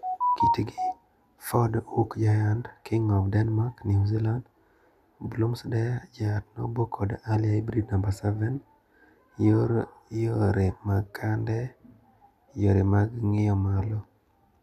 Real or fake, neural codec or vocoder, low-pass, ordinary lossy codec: real; none; 14.4 kHz; none